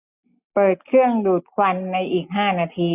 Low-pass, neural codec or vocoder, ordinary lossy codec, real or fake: 3.6 kHz; none; none; real